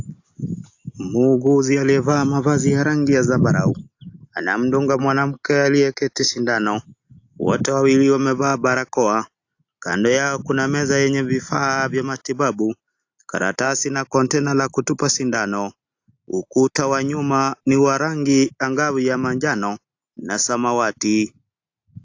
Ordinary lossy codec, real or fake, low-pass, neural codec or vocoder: AAC, 48 kbps; real; 7.2 kHz; none